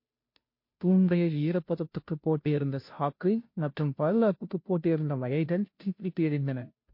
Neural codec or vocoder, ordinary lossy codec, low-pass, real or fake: codec, 16 kHz, 0.5 kbps, FunCodec, trained on Chinese and English, 25 frames a second; MP3, 32 kbps; 5.4 kHz; fake